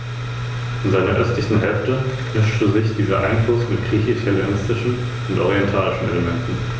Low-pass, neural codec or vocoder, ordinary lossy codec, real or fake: none; none; none; real